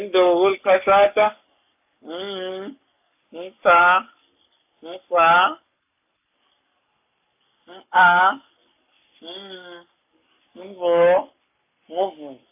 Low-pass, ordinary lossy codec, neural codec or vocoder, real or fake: 3.6 kHz; AAC, 32 kbps; codec, 44.1 kHz, 7.8 kbps, Pupu-Codec; fake